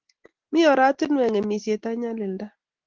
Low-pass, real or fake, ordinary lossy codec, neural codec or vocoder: 7.2 kHz; real; Opus, 16 kbps; none